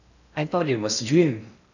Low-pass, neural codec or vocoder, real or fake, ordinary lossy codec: 7.2 kHz; codec, 16 kHz in and 24 kHz out, 0.6 kbps, FocalCodec, streaming, 4096 codes; fake; none